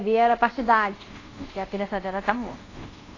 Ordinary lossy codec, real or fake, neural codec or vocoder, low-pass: AAC, 32 kbps; fake; codec, 24 kHz, 0.5 kbps, DualCodec; 7.2 kHz